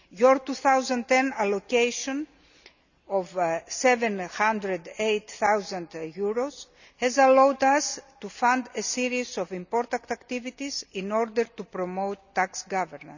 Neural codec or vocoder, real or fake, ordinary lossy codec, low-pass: none; real; none; 7.2 kHz